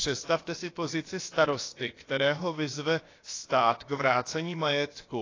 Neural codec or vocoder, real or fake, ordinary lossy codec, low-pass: codec, 16 kHz, about 1 kbps, DyCAST, with the encoder's durations; fake; AAC, 32 kbps; 7.2 kHz